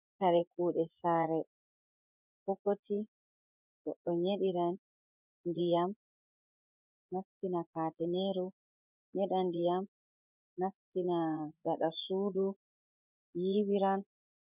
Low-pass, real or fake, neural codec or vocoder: 3.6 kHz; fake; vocoder, 24 kHz, 100 mel bands, Vocos